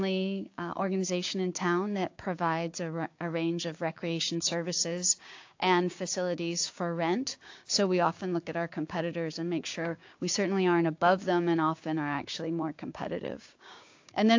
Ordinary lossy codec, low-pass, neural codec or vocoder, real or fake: AAC, 48 kbps; 7.2 kHz; codec, 16 kHz, 6 kbps, DAC; fake